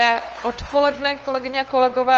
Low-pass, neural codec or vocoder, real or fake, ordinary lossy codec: 7.2 kHz; codec, 16 kHz, 2 kbps, FunCodec, trained on LibriTTS, 25 frames a second; fake; Opus, 24 kbps